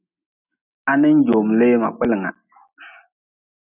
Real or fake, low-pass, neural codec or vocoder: real; 3.6 kHz; none